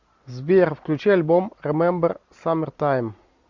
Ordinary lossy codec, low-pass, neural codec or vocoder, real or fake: Opus, 64 kbps; 7.2 kHz; none; real